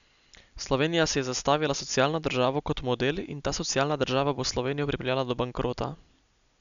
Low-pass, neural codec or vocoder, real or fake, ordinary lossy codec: 7.2 kHz; none; real; none